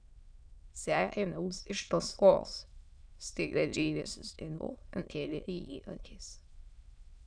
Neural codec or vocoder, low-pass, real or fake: autoencoder, 22.05 kHz, a latent of 192 numbers a frame, VITS, trained on many speakers; 9.9 kHz; fake